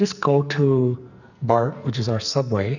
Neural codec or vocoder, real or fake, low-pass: codec, 44.1 kHz, 2.6 kbps, SNAC; fake; 7.2 kHz